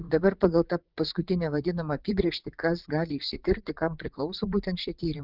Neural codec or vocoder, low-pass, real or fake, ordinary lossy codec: vocoder, 44.1 kHz, 80 mel bands, Vocos; 5.4 kHz; fake; Opus, 32 kbps